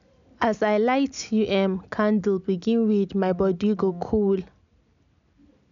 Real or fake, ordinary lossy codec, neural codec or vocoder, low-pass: real; none; none; 7.2 kHz